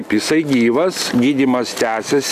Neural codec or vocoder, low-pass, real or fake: none; 14.4 kHz; real